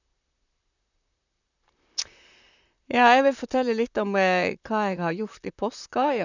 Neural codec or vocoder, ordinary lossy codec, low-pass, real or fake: vocoder, 44.1 kHz, 128 mel bands, Pupu-Vocoder; none; 7.2 kHz; fake